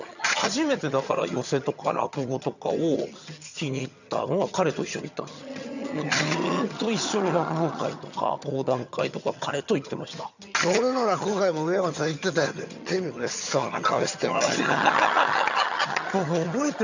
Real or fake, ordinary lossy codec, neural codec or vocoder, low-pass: fake; none; vocoder, 22.05 kHz, 80 mel bands, HiFi-GAN; 7.2 kHz